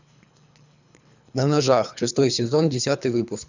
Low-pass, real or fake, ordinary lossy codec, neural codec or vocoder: 7.2 kHz; fake; none; codec, 24 kHz, 3 kbps, HILCodec